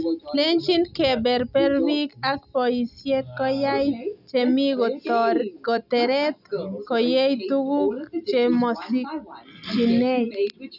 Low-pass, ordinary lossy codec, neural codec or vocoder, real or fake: 5.4 kHz; none; none; real